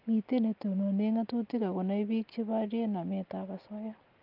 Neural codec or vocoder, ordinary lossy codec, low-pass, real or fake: none; Opus, 32 kbps; 5.4 kHz; real